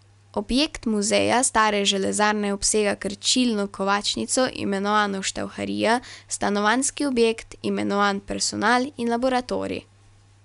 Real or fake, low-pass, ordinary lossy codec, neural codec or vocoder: real; 10.8 kHz; none; none